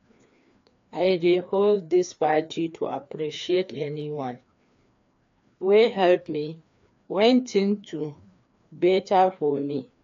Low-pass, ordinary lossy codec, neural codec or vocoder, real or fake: 7.2 kHz; AAC, 48 kbps; codec, 16 kHz, 2 kbps, FreqCodec, larger model; fake